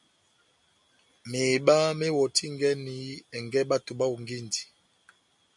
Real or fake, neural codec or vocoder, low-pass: real; none; 10.8 kHz